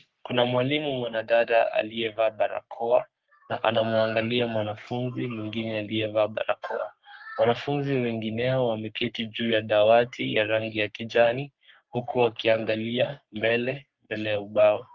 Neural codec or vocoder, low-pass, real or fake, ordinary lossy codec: codec, 44.1 kHz, 3.4 kbps, Pupu-Codec; 7.2 kHz; fake; Opus, 32 kbps